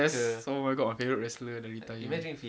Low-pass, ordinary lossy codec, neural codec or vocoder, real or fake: none; none; none; real